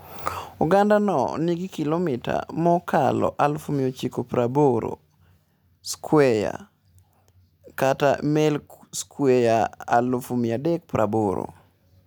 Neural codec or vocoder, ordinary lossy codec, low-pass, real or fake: none; none; none; real